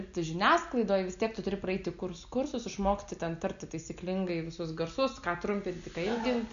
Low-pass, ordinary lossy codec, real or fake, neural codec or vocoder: 7.2 kHz; MP3, 96 kbps; real; none